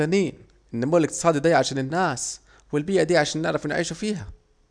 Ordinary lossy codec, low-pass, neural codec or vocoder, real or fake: none; 9.9 kHz; none; real